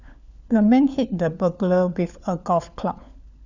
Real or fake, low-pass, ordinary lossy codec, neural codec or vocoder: fake; 7.2 kHz; none; codec, 16 kHz, 4 kbps, FunCodec, trained on LibriTTS, 50 frames a second